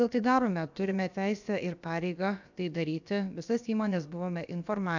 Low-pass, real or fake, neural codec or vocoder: 7.2 kHz; fake; codec, 16 kHz, about 1 kbps, DyCAST, with the encoder's durations